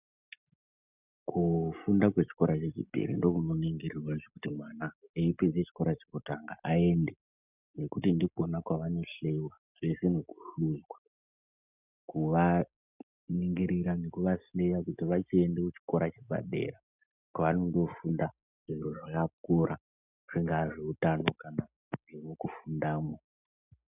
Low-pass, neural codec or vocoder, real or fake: 3.6 kHz; none; real